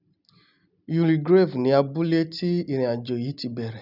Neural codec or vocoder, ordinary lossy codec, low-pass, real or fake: none; none; 5.4 kHz; real